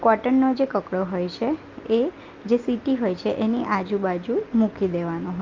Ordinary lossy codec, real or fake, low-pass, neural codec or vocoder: Opus, 24 kbps; real; 7.2 kHz; none